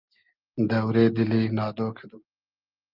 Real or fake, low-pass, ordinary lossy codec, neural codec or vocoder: real; 5.4 kHz; Opus, 32 kbps; none